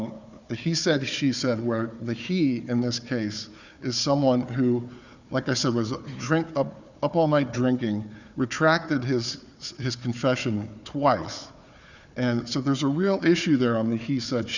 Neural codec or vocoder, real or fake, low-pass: codec, 16 kHz, 4 kbps, FunCodec, trained on Chinese and English, 50 frames a second; fake; 7.2 kHz